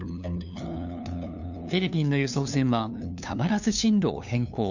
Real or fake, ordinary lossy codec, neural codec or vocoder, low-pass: fake; none; codec, 16 kHz, 2 kbps, FunCodec, trained on LibriTTS, 25 frames a second; 7.2 kHz